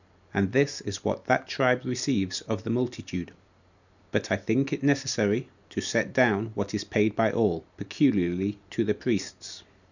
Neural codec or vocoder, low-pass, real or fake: none; 7.2 kHz; real